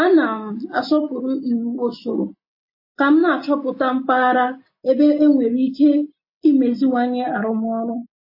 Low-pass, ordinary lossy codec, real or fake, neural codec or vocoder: 5.4 kHz; MP3, 24 kbps; real; none